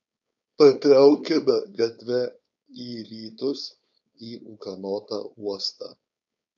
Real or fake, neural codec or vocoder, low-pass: fake; codec, 16 kHz, 4.8 kbps, FACodec; 7.2 kHz